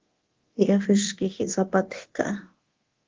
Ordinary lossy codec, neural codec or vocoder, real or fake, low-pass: Opus, 16 kbps; codec, 24 kHz, 0.9 kbps, DualCodec; fake; 7.2 kHz